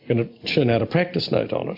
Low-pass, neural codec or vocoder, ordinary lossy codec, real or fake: 5.4 kHz; none; MP3, 48 kbps; real